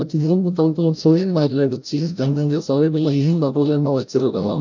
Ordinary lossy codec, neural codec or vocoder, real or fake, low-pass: none; codec, 16 kHz, 0.5 kbps, FreqCodec, larger model; fake; 7.2 kHz